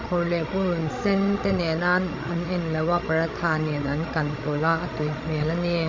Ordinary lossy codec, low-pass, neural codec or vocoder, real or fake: MP3, 32 kbps; 7.2 kHz; codec, 16 kHz, 16 kbps, FreqCodec, larger model; fake